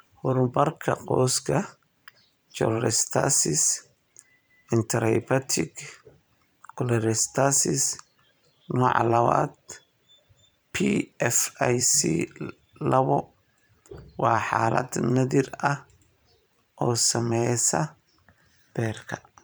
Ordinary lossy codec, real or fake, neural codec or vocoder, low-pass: none; fake; vocoder, 44.1 kHz, 128 mel bands every 512 samples, BigVGAN v2; none